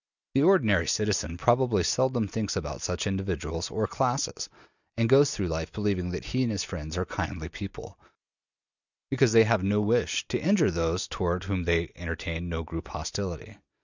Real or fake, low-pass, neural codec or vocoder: real; 7.2 kHz; none